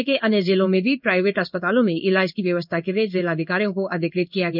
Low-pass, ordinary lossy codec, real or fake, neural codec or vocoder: 5.4 kHz; none; fake; codec, 16 kHz in and 24 kHz out, 1 kbps, XY-Tokenizer